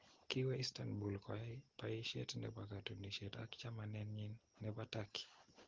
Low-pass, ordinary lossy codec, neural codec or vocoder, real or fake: 7.2 kHz; Opus, 16 kbps; none; real